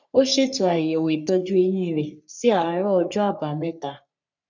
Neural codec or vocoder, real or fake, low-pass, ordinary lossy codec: codec, 44.1 kHz, 3.4 kbps, Pupu-Codec; fake; 7.2 kHz; none